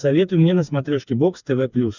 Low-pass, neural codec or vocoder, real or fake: 7.2 kHz; codec, 16 kHz, 4 kbps, FreqCodec, smaller model; fake